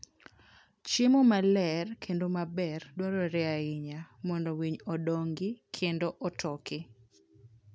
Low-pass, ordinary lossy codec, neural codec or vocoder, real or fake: none; none; none; real